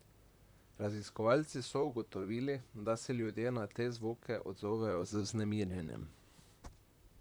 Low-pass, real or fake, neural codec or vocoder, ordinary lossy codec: none; fake; vocoder, 44.1 kHz, 128 mel bands, Pupu-Vocoder; none